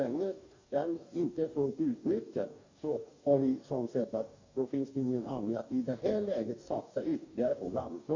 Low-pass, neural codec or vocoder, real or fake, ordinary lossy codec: 7.2 kHz; codec, 44.1 kHz, 2.6 kbps, DAC; fake; MP3, 48 kbps